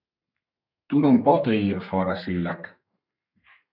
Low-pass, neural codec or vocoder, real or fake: 5.4 kHz; codec, 32 kHz, 1.9 kbps, SNAC; fake